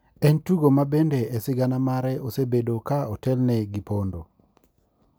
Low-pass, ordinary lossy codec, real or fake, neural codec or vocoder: none; none; real; none